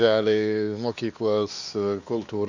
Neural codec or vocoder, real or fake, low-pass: codec, 16 kHz, 2 kbps, X-Codec, WavLM features, trained on Multilingual LibriSpeech; fake; 7.2 kHz